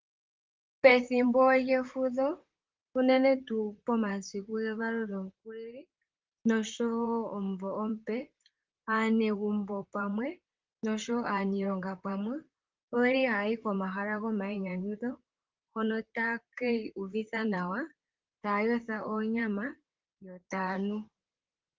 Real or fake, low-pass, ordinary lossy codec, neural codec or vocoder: fake; 7.2 kHz; Opus, 32 kbps; vocoder, 44.1 kHz, 128 mel bands, Pupu-Vocoder